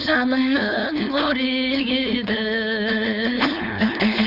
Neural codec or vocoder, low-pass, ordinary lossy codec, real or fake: codec, 16 kHz, 4.8 kbps, FACodec; 5.4 kHz; none; fake